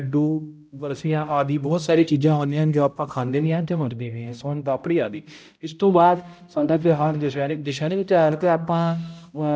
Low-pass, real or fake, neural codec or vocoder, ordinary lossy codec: none; fake; codec, 16 kHz, 0.5 kbps, X-Codec, HuBERT features, trained on balanced general audio; none